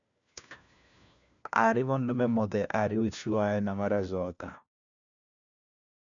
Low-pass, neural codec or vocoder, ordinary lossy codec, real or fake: 7.2 kHz; codec, 16 kHz, 1 kbps, FunCodec, trained on LibriTTS, 50 frames a second; MP3, 96 kbps; fake